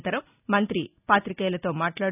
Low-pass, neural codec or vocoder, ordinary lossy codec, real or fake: 3.6 kHz; none; none; real